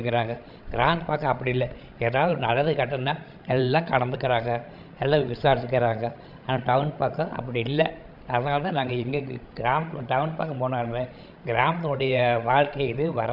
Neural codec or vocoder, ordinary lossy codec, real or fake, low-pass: codec, 16 kHz, 16 kbps, FreqCodec, larger model; AAC, 48 kbps; fake; 5.4 kHz